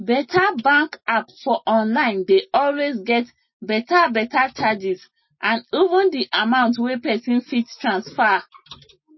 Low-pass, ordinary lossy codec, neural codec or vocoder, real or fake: 7.2 kHz; MP3, 24 kbps; none; real